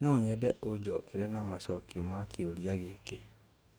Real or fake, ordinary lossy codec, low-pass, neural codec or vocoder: fake; none; none; codec, 44.1 kHz, 2.6 kbps, DAC